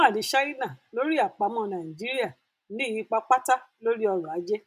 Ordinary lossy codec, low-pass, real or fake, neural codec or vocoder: none; 14.4 kHz; real; none